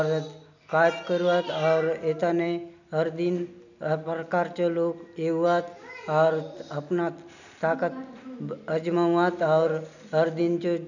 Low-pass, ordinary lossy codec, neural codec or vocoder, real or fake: 7.2 kHz; none; none; real